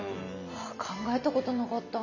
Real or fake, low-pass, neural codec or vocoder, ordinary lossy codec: real; 7.2 kHz; none; none